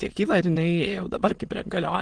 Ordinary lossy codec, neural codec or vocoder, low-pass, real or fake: Opus, 16 kbps; autoencoder, 22.05 kHz, a latent of 192 numbers a frame, VITS, trained on many speakers; 9.9 kHz; fake